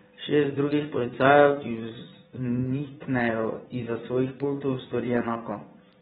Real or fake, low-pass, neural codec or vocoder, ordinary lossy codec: fake; 9.9 kHz; vocoder, 22.05 kHz, 80 mel bands, Vocos; AAC, 16 kbps